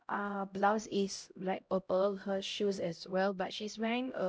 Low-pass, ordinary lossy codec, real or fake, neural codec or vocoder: none; none; fake; codec, 16 kHz, 0.5 kbps, X-Codec, HuBERT features, trained on LibriSpeech